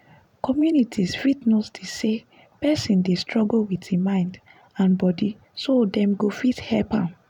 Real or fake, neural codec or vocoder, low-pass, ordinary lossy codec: fake; vocoder, 44.1 kHz, 128 mel bands every 512 samples, BigVGAN v2; 19.8 kHz; none